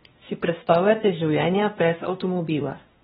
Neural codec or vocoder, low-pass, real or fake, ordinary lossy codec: codec, 16 kHz, 1 kbps, X-Codec, WavLM features, trained on Multilingual LibriSpeech; 7.2 kHz; fake; AAC, 16 kbps